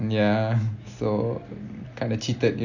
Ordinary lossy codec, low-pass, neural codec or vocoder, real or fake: AAC, 48 kbps; 7.2 kHz; none; real